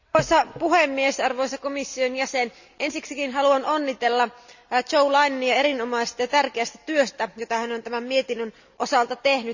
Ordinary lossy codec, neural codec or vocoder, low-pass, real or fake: none; none; 7.2 kHz; real